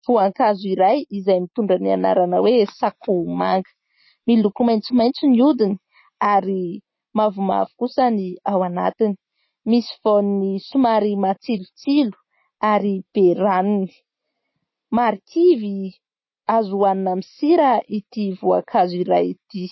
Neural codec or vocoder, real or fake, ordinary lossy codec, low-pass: none; real; MP3, 24 kbps; 7.2 kHz